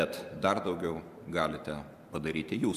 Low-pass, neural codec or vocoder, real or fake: 14.4 kHz; vocoder, 44.1 kHz, 128 mel bands every 512 samples, BigVGAN v2; fake